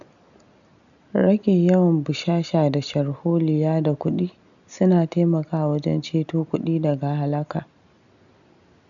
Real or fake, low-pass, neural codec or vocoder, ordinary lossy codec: real; 7.2 kHz; none; none